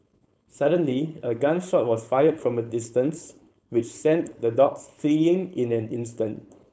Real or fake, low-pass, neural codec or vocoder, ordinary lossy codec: fake; none; codec, 16 kHz, 4.8 kbps, FACodec; none